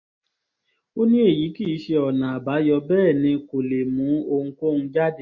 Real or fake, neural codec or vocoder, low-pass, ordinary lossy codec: real; none; 7.2 kHz; MP3, 32 kbps